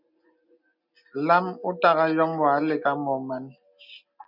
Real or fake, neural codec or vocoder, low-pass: real; none; 5.4 kHz